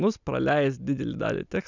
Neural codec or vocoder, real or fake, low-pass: none; real; 7.2 kHz